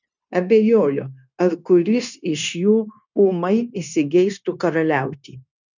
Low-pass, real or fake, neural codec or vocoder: 7.2 kHz; fake; codec, 16 kHz, 0.9 kbps, LongCat-Audio-Codec